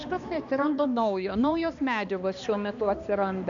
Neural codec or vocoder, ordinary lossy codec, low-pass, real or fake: codec, 16 kHz, 2 kbps, X-Codec, HuBERT features, trained on balanced general audio; AAC, 64 kbps; 7.2 kHz; fake